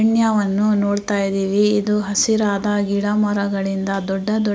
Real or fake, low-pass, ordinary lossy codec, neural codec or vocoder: real; none; none; none